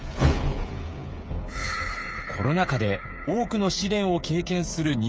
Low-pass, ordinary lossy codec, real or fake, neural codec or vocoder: none; none; fake; codec, 16 kHz, 8 kbps, FreqCodec, smaller model